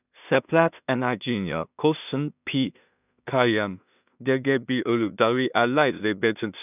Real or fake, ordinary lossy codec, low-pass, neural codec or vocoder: fake; none; 3.6 kHz; codec, 16 kHz in and 24 kHz out, 0.4 kbps, LongCat-Audio-Codec, two codebook decoder